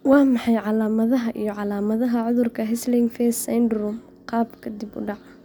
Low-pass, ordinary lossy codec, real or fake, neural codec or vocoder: none; none; real; none